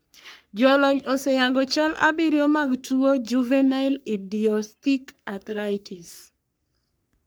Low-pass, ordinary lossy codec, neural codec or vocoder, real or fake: none; none; codec, 44.1 kHz, 3.4 kbps, Pupu-Codec; fake